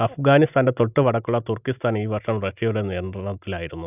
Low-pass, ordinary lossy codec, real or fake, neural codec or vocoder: 3.6 kHz; none; real; none